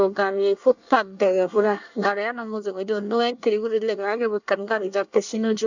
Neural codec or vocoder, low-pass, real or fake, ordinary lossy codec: codec, 24 kHz, 1 kbps, SNAC; 7.2 kHz; fake; none